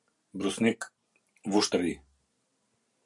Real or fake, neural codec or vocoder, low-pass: real; none; 10.8 kHz